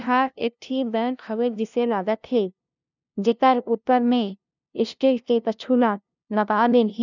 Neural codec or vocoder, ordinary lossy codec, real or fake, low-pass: codec, 16 kHz, 0.5 kbps, FunCodec, trained on LibriTTS, 25 frames a second; none; fake; 7.2 kHz